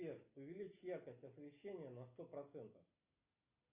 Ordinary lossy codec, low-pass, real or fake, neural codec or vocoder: AAC, 32 kbps; 3.6 kHz; real; none